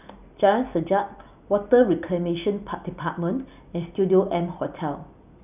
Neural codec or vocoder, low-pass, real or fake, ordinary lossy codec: none; 3.6 kHz; real; none